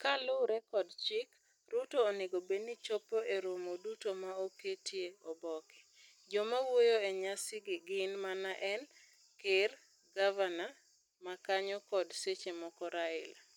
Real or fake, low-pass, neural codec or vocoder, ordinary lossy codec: real; 19.8 kHz; none; none